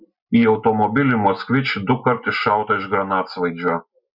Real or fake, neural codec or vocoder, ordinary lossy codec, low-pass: real; none; Opus, 64 kbps; 5.4 kHz